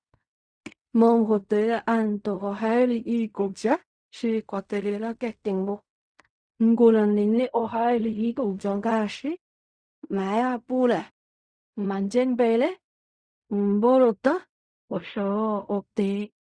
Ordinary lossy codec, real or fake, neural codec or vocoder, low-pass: Opus, 64 kbps; fake; codec, 16 kHz in and 24 kHz out, 0.4 kbps, LongCat-Audio-Codec, fine tuned four codebook decoder; 9.9 kHz